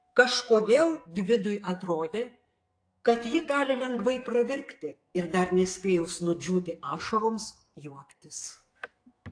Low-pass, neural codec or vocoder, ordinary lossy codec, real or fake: 9.9 kHz; codec, 44.1 kHz, 2.6 kbps, SNAC; AAC, 48 kbps; fake